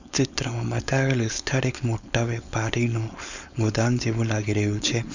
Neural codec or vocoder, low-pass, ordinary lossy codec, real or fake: codec, 16 kHz, 4.8 kbps, FACodec; 7.2 kHz; none; fake